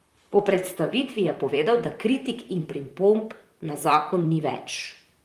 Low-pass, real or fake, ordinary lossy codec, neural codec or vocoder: 14.4 kHz; fake; Opus, 32 kbps; vocoder, 44.1 kHz, 128 mel bands, Pupu-Vocoder